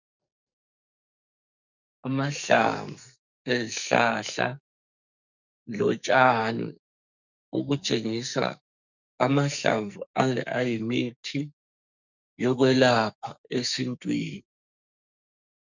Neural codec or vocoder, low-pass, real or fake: codec, 44.1 kHz, 2.6 kbps, SNAC; 7.2 kHz; fake